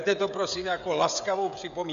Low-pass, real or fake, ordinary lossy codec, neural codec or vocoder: 7.2 kHz; fake; AAC, 64 kbps; codec, 16 kHz, 16 kbps, FreqCodec, smaller model